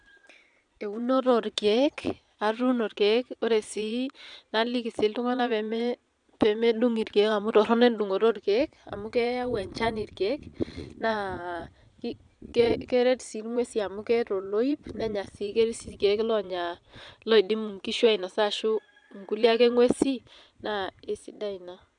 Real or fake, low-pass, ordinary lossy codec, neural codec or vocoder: fake; 9.9 kHz; none; vocoder, 22.05 kHz, 80 mel bands, Vocos